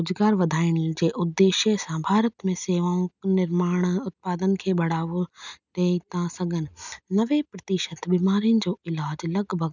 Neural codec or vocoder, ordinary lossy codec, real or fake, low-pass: none; none; real; 7.2 kHz